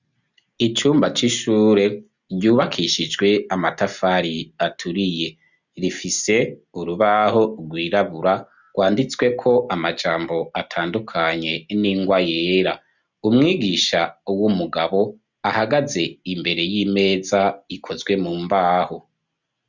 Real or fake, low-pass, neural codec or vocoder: real; 7.2 kHz; none